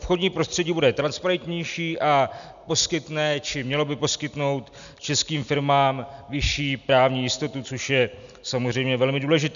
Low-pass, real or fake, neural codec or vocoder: 7.2 kHz; real; none